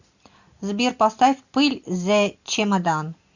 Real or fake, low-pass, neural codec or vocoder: real; 7.2 kHz; none